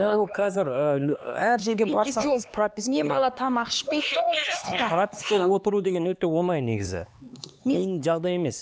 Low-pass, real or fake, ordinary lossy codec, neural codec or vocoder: none; fake; none; codec, 16 kHz, 2 kbps, X-Codec, HuBERT features, trained on LibriSpeech